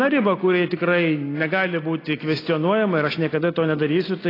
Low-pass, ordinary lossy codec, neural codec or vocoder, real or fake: 5.4 kHz; AAC, 24 kbps; none; real